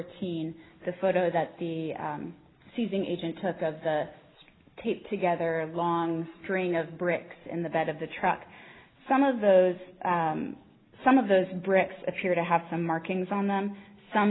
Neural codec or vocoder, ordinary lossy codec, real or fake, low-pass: none; AAC, 16 kbps; real; 7.2 kHz